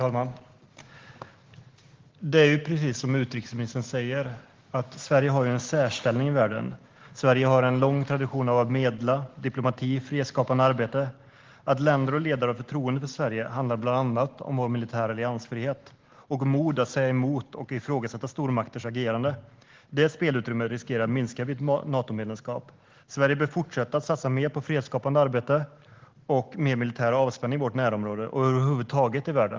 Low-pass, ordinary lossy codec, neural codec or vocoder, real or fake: 7.2 kHz; Opus, 16 kbps; none; real